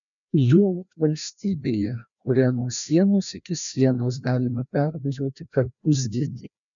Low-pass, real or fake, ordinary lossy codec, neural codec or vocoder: 7.2 kHz; fake; MP3, 96 kbps; codec, 16 kHz, 1 kbps, FreqCodec, larger model